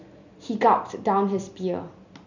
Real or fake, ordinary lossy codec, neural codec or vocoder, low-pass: real; none; none; 7.2 kHz